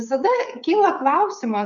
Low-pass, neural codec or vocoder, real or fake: 7.2 kHz; codec, 16 kHz, 8 kbps, FreqCodec, smaller model; fake